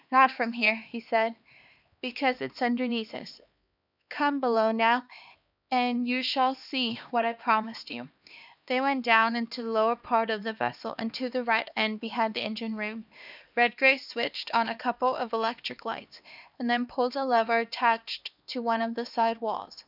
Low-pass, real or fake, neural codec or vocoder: 5.4 kHz; fake; codec, 16 kHz, 2 kbps, X-Codec, HuBERT features, trained on LibriSpeech